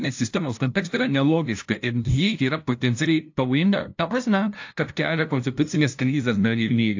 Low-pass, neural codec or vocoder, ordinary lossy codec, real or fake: 7.2 kHz; codec, 16 kHz, 0.5 kbps, FunCodec, trained on LibriTTS, 25 frames a second; AAC, 48 kbps; fake